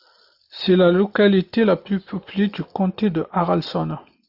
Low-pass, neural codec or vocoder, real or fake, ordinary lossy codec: 5.4 kHz; none; real; AAC, 48 kbps